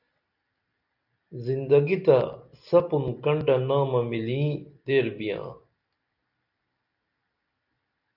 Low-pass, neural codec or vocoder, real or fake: 5.4 kHz; none; real